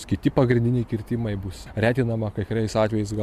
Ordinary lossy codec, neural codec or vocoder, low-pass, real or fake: MP3, 96 kbps; none; 14.4 kHz; real